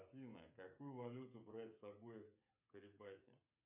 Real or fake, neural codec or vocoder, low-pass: fake; codec, 16 kHz, 8 kbps, FreqCodec, smaller model; 3.6 kHz